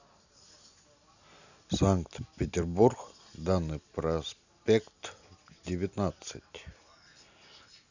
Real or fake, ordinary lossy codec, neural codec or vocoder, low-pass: real; none; none; 7.2 kHz